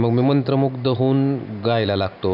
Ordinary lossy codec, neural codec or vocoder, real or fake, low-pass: none; none; real; 5.4 kHz